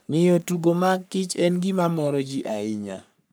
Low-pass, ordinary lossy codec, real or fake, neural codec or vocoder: none; none; fake; codec, 44.1 kHz, 3.4 kbps, Pupu-Codec